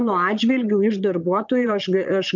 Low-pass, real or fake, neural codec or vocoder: 7.2 kHz; fake; vocoder, 22.05 kHz, 80 mel bands, Vocos